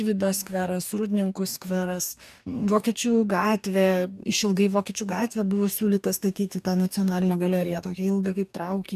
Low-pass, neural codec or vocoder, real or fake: 14.4 kHz; codec, 44.1 kHz, 2.6 kbps, DAC; fake